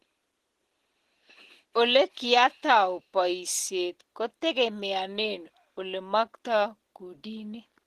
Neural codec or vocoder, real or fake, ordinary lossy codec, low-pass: none; real; Opus, 16 kbps; 14.4 kHz